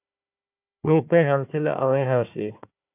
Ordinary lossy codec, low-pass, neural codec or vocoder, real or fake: AAC, 24 kbps; 3.6 kHz; codec, 16 kHz, 1 kbps, FunCodec, trained on Chinese and English, 50 frames a second; fake